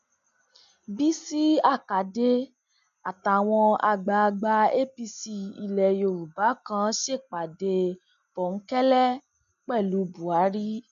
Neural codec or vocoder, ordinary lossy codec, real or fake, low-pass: none; none; real; 7.2 kHz